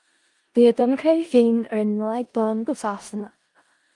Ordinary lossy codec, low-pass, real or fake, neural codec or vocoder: Opus, 32 kbps; 10.8 kHz; fake; codec, 16 kHz in and 24 kHz out, 0.4 kbps, LongCat-Audio-Codec, four codebook decoder